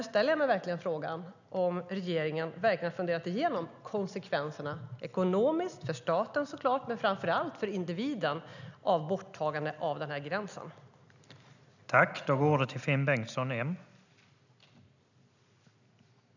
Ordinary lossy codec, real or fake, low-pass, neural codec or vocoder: none; real; 7.2 kHz; none